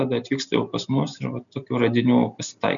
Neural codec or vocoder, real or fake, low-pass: none; real; 7.2 kHz